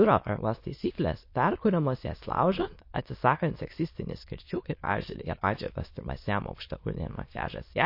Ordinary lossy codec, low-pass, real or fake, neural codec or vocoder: MP3, 32 kbps; 5.4 kHz; fake; autoencoder, 22.05 kHz, a latent of 192 numbers a frame, VITS, trained on many speakers